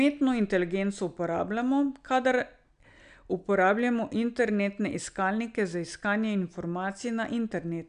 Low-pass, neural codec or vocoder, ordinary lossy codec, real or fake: 9.9 kHz; none; none; real